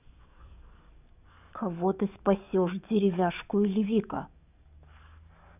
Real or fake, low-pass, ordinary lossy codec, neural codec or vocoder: fake; 3.6 kHz; none; codec, 44.1 kHz, 7.8 kbps, DAC